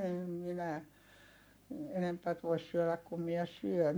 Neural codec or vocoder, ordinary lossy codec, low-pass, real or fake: codec, 44.1 kHz, 7.8 kbps, Pupu-Codec; none; none; fake